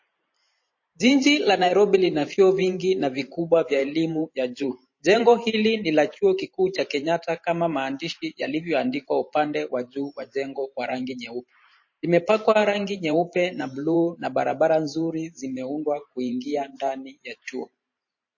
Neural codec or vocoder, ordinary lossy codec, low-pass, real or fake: vocoder, 44.1 kHz, 128 mel bands every 512 samples, BigVGAN v2; MP3, 32 kbps; 7.2 kHz; fake